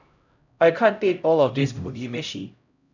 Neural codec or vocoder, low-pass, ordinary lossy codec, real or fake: codec, 16 kHz, 0.5 kbps, X-Codec, HuBERT features, trained on LibriSpeech; 7.2 kHz; none; fake